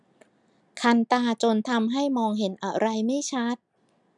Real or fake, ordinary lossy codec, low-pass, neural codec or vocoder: real; none; 10.8 kHz; none